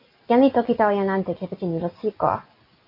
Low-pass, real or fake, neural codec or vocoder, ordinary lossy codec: 5.4 kHz; real; none; AAC, 32 kbps